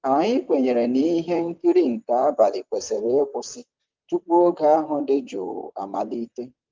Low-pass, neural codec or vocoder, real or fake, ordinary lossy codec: 7.2 kHz; vocoder, 44.1 kHz, 128 mel bands, Pupu-Vocoder; fake; Opus, 24 kbps